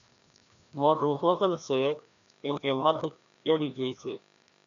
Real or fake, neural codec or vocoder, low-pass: fake; codec, 16 kHz, 1 kbps, FreqCodec, larger model; 7.2 kHz